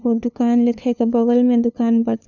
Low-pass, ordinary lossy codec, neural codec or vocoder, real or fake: 7.2 kHz; none; codec, 16 kHz, 2 kbps, FunCodec, trained on LibriTTS, 25 frames a second; fake